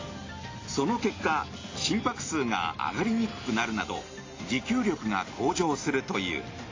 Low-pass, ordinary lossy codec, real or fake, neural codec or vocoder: 7.2 kHz; AAC, 32 kbps; real; none